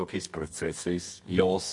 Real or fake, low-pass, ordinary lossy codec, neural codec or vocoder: fake; 10.8 kHz; MP3, 48 kbps; codec, 24 kHz, 0.9 kbps, WavTokenizer, medium music audio release